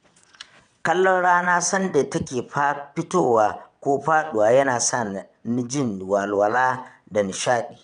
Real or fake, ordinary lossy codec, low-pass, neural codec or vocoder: fake; none; 9.9 kHz; vocoder, 22.05 kHz, 80 mel bands, WaveNeXt